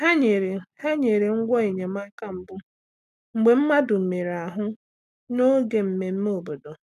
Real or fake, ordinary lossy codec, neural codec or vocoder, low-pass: real; none; none; 14.4 kHz